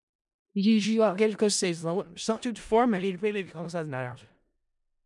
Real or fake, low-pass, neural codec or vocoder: fake; 10.8 kHz; codec, 16 kHz in and 24 kHz out, 0.4 kbps, LongCat-Audio-Codec, four codebook decoder